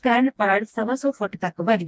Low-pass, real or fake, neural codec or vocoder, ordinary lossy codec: none; fake; codec, 16 kHz, 1 kbps, FreqCodec, smaller model; none